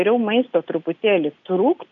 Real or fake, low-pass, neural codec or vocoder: real; 7.2 kHz; none